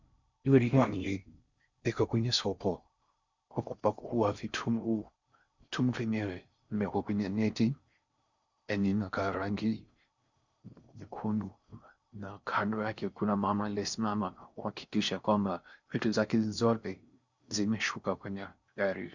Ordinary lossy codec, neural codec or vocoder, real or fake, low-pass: Opus, 64 kbps; codec, 16 kHz in and 24 kHz out, 0.6 kbps, FocalCodec, streaming, 4096 codes; fake; 7.2 kHz